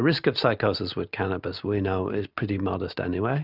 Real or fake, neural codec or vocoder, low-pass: real; none; 5.4 kHz